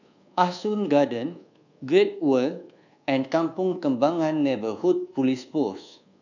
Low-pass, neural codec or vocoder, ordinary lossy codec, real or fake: 7.2 kHz; codec, 24 kHz, 1.2 kbps, DualCodec; none; fake